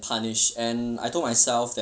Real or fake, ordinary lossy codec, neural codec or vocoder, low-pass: real; none; none; none